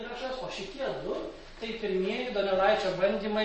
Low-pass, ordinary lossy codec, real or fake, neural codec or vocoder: 10.8 kHz; MP3, 32 kbps; real; none